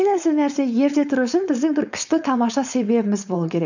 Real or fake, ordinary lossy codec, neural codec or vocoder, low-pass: fake; none; codec, 16 kHz, 4.8 kbps, FACodec; 7.2 kHz